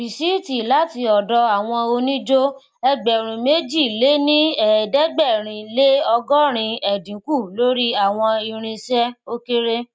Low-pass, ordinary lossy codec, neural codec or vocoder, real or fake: none; none; none; real